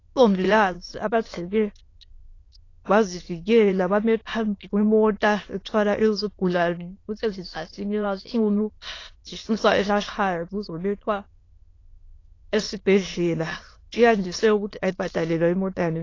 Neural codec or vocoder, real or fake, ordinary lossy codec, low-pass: autoencoder, 22.05 kHz, a latent of 192 numbers a frame, VITS, trained on many speakers; fake; AAC, 32 kbps; 7.2 kHz